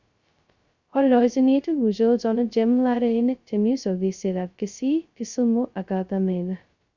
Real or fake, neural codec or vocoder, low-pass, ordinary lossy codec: fake; codec, 16 kHz, 0.2 kbps, FocalCodec; 7.2 kHz; Opus, 64 kbps